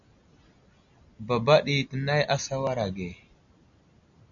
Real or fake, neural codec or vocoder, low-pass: real; none; 7.2 kHz